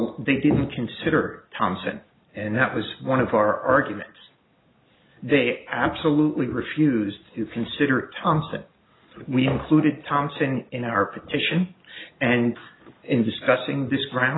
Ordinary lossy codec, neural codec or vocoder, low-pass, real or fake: AAC, 16 kbps; none; 7.2 kHz; real